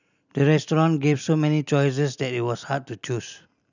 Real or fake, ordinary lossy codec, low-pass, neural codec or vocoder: real; none; 7.2 kHz; none